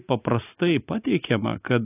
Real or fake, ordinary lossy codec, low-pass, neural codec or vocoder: real; AAC, 24 kbps; 3.6 kHz; none